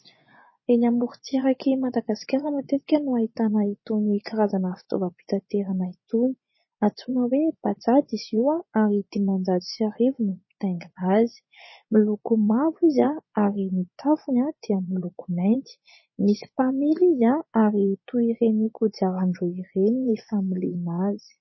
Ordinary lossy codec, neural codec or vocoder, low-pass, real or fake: MP3, 24 kbps; vocoder, 24 kHz, 100 mel bands, Vocos; 7.2 kHz; fake